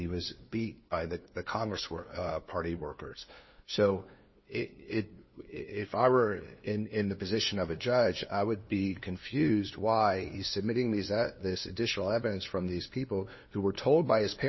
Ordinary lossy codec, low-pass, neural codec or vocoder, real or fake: MP3, 24 kbps; 7.2 kHz; codec, 16 kHz, 2 kbps, FunCodec, trained on LibriTTS, 25 frames a second; fake